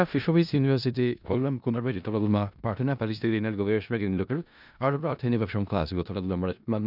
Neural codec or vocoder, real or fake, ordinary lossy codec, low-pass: codec, 16 kHz in and 24 kHz out, 0.4 kbps, LongCat-Audio-Codec, four codebook decoder; fake; none; 5.4 kHz